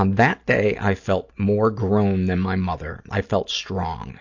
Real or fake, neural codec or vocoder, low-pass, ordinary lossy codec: real; none; 7.2 kHz; AAC, 48 kbps